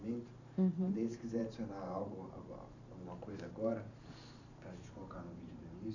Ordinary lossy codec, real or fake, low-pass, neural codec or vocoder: none; fake; 7.2 kHz; vocoder, 44.1 kHz, 128 mel bands every 512 samples, BigVGAN v2